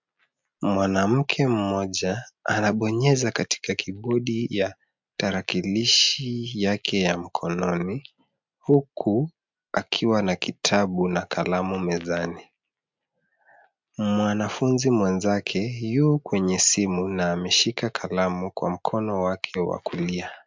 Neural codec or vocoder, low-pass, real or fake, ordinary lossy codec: none; 7.2 kHz; real; MP3, 64 kbps